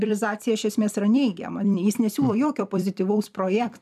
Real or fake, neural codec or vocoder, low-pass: fake; vocoder, 44.1 kHz, 128 mel bands every 256 samples, BigVGAN v2; 14.4 kHz